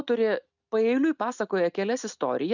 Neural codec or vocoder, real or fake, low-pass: none; real; 7.2 kHz